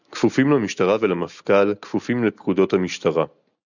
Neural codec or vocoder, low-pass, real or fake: none; 7.2 kHz; real